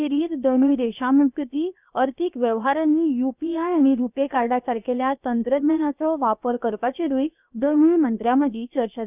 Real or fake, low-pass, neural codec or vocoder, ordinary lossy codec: fake; 3.6 kHz; codec, 16 kHz, about 1 kbps, DyCAST, with the encoder's durations; none